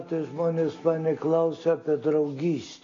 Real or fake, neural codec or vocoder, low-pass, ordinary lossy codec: real; none; 7.2 kHz; AAC, 32 kbps